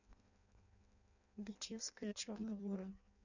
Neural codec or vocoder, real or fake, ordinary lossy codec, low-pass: codec, 16 kHz in and 24 kHz out, 0.6 kbps, FireRedTTS-2 codec; fake; none; 7.2 kHz